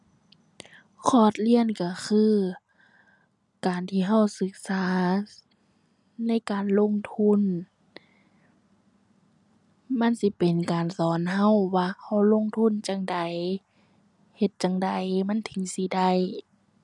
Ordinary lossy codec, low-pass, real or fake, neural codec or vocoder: none; 10.8 kHz; real; none